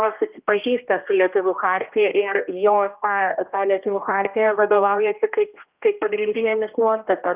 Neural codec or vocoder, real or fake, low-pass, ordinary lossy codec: codec, 16 kHz, 1 kbps, X-Codec, HuBERT features, trained on general audio; fake; 3.6 kHz; Opus, 24 kbps